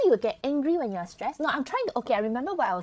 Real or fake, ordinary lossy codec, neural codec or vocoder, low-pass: fake; none; codec, 16 kHz, 16 kbps, FreqCodec, larger model; none